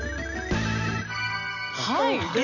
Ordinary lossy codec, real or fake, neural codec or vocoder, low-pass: none; real; none; 7.2 kHz